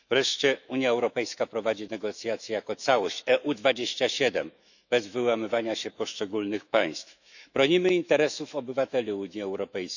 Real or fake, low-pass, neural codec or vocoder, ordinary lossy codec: fake; 7.2 kHz; autoencoder, 48 kHz, 128 numbers a frame, DAC-VAE, trained on Japanese speech; none